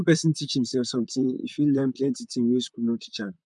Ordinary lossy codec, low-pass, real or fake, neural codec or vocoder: none; 10.8 kHz; fake; vocoder, 44.1 kHz, 128 mel bands, Pupu-Vocoder